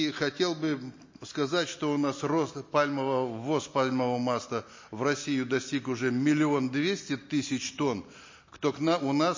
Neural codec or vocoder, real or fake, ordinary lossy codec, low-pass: none; real; MP3, 32 kbps; 7.2 kHz